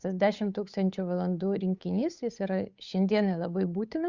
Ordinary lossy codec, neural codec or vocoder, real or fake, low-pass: Opus, 64 kbps; codec, 16 kHz, 8 kbps, FunCodec, trained on Chinese and English, 25 frames a second; fake; 7.2 kHz